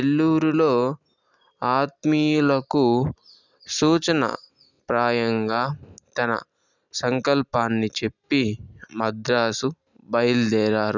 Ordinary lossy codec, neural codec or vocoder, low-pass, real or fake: none; none; 7.2 kHz; real